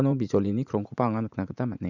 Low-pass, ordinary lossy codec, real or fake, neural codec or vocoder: 7.2 kHz; none; fake; vocoder, 44.1 kHz, 80 mel bands, Vocos